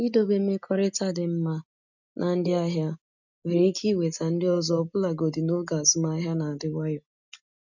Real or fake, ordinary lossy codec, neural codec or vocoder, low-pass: real; none; none; 7.2 kHz